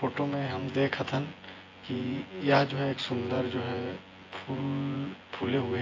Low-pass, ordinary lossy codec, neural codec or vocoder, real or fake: 7.2 kHz; AAC, 32 kbps; vocoder, 24 kHz, 100 mel bands, Vocos; fake